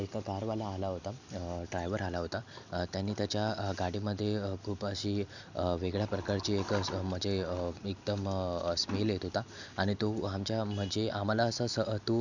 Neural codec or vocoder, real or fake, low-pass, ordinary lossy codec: none; real; 7.2 kHz; none